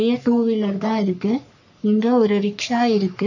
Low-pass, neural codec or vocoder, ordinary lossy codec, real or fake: 7.2 kHz; codec, 44.1 kHz, 3.4 kbps, Pupu-Codec; none; fake